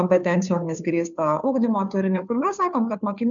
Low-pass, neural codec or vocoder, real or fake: 7.2 kHz; codec, 16 kHz, 2 kbps, FunCodec, trained on Chinese and English, 25 frames a second; fake